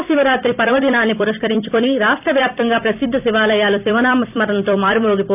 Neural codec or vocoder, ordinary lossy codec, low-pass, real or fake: vocoder, 44.1 kHz, 128 mel bands every 256 samples, BigVGAN v2; AAC, 32 kbps; 3.6 kHz; fake